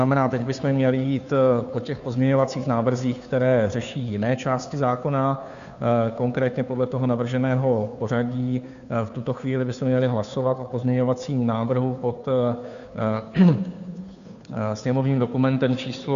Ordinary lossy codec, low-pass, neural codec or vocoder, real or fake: AAC, 96 kbps; 7.2 kHz; codec, 16 kHz, 2 kbps, FunCodec, trained on Chinese and English, 25 frames a second; fake